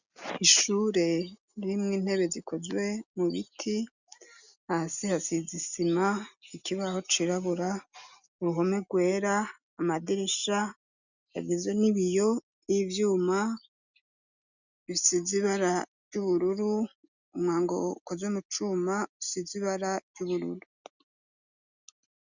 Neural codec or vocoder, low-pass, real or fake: none; 7.2 kHz; real